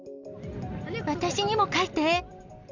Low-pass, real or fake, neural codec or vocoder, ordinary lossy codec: 7.2 kHz; real; none; none